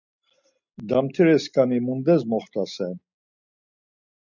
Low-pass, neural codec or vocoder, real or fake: 7.2 kHz; none; real